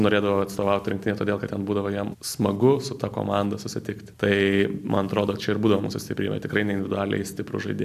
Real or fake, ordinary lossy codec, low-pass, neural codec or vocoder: real; AAC, 96 kbps; 14.4 kHz; none